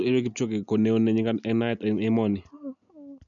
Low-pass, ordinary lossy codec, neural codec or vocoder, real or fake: 7.2 kHz; none; none; real